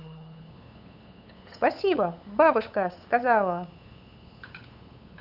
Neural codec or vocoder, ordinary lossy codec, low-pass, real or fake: codec, 16 kHz, 8 kbps, FunCodec, trained on LibriTTS, 25 frames a second; none; 5.4 kHz; fake